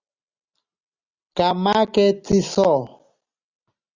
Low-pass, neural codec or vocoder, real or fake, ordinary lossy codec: 7.2 kHz; none; real; Opus, 64 kbps